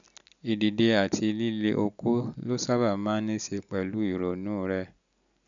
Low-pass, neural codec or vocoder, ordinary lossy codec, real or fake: 7.2 kHz; none; none; real